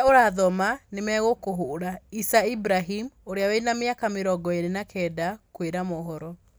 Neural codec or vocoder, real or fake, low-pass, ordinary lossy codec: none; real; none; none